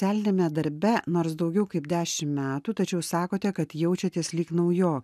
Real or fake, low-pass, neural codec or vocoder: real; 14.4 kHz; none